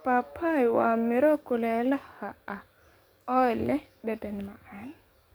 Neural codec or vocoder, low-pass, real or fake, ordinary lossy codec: codec, 44.1 kHz, 7.8 kbps, DAC; none; fake; none